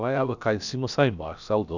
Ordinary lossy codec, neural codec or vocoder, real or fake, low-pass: none; codec, 16 kHz, about 1 kbps, DyCAST, with the encoder's durations; fake; 7.2 kHz